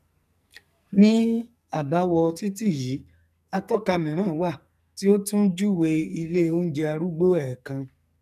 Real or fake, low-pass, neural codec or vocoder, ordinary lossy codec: fake; 14.4 kHz; codec, 44.1 kHz, 2.6 kbps, SNAC; none